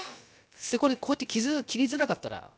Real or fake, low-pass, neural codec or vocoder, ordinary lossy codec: fake; none; codec, 16 kHz, about 1 kbps, DyCAST, with the encoder's durations; none